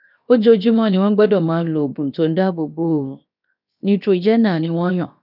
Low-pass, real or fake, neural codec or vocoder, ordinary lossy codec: 5.4 kHz; fake; codec, 16 kHz, 0.7 kbps, FocalCodec; none